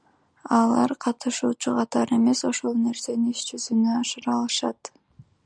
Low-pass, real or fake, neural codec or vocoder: 9.9 kHz; real; none